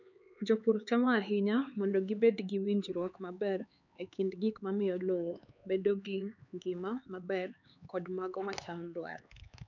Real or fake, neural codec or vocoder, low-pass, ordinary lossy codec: fake; codec, 16 kHz, 4 kbps, X-Codec, HuBERT features, trained on LibriSpeech; 7.2 kHz; none